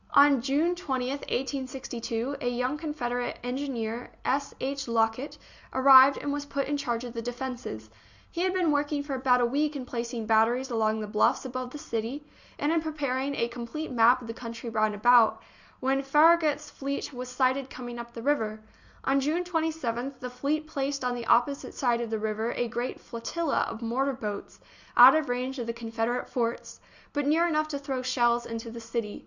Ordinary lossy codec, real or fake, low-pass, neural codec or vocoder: Opus, 64 kbps; real; 7.2 kHz; none